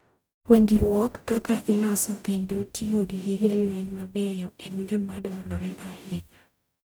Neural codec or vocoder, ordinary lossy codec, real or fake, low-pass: codec, 44.1 kHz, 0.9 kbps, DAC; none; fake; none